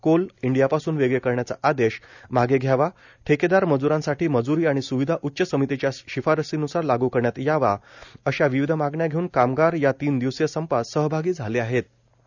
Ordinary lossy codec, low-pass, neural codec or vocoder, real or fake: none; 7.2 kHz; none; real